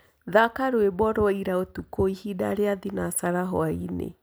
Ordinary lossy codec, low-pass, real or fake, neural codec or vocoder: none; none; real; none